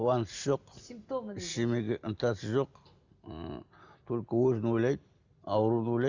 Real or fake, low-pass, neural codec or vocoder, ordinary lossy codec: real; 7.2 kHz; none; Opus, 64 kbps